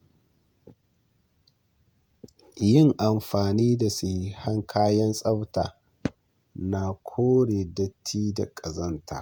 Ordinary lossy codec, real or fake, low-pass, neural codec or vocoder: none; fake; none; vocoder, 48 kHz, 128 mel bands, Vocos